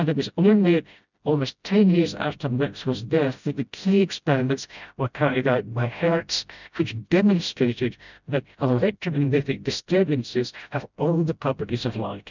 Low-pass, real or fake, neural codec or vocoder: 7.2 kHz; fake; codec, 16 kHz, 0.5 kbps, FreqCodec, smaller model